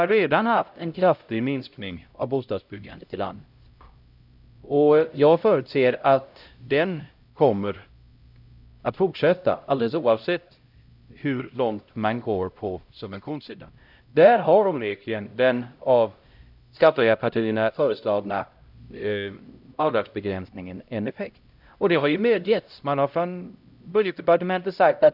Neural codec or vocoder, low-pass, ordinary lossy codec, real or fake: codec, 16 kHz, 0.5 kbps, X-Codec, HuBERT features, trained on LibriSpeech; 5.4 kHz; none; fake